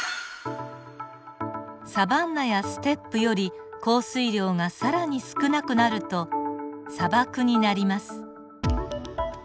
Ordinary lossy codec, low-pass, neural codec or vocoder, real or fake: none; none; none; real